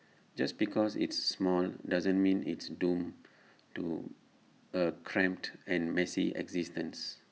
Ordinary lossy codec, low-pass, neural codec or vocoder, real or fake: none; none; none; real